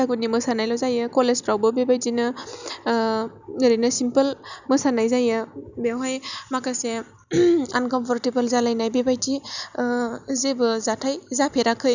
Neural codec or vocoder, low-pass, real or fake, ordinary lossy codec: none; 7.2 kHz; real; none